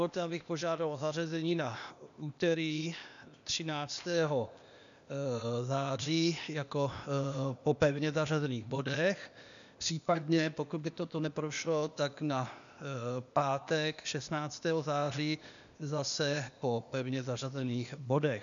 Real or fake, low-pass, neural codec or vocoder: fake; 7.2 kHz; codec, 16 kHz, 0.8 kbps, ZipCodec